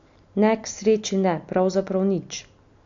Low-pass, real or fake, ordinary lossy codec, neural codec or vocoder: 7.2 kHz; real; AAC, 48 kbps; none